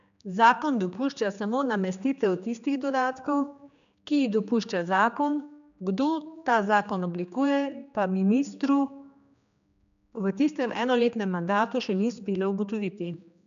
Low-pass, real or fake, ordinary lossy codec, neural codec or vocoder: 7.2 kHz; fake; none; codec, 16 kHz, 2 kbps, X-Codec, HuBERT features, trained on general audio